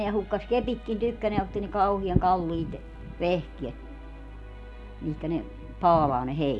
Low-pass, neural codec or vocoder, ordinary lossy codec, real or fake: none; none; none; real